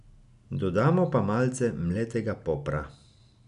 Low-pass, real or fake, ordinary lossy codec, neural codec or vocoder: 10.8 kHz; real; none; none